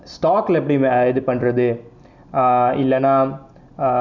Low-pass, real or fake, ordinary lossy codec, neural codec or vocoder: 7.2 kHz; real; none; none